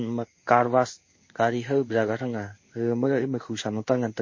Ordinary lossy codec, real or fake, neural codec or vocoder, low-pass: MP3, 32 kbps; fake; codec, 16 kHz in and 24 kHz out, 1 kbps, XY-Tokenizer; 7.2 kHz